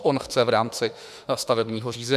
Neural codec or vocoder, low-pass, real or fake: autoencoder, 48 kHz, 32 numbers a frame, DAC-VAE, trained on Japanese speech; 14.4 kHz; fake